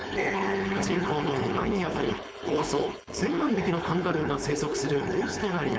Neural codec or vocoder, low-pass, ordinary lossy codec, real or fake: codec, 16 kHz, 4.8 kbps, FACodec; none; none; fake